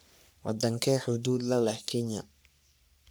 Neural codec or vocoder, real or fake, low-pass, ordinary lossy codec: codec, 44.1 kHz, 3.4 kbps, Pupu-Codec; fake; none; none